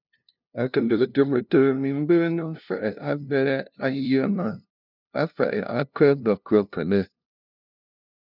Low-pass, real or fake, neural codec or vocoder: 5.4 kHz; fake; codec, 16 kHz, 0.5 kbps, FunCodec, trained on LibriTTS, 25 frames a second